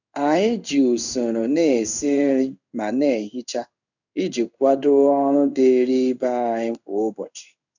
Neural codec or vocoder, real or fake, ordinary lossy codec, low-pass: codec, 16 kHz in and 24 kHz out, 1 kbps, XY-Tokenizer; fake; none; 7.2 kHz